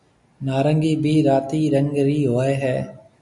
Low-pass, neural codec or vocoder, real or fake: 10.8 kHz; none; real